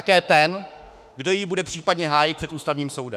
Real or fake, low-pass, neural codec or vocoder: fake; 14.4 kHz; autoencoder, 48 kHz, 32 numbers a frame, DAC-VAE, trained on Japanese speech